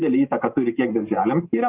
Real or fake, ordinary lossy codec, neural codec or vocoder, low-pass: real; Opus, 24 kbps; none; 3.6 kHz